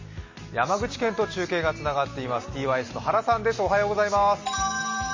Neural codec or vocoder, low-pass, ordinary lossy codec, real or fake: none; 7.2 kHz; MP3, 32 kbps; real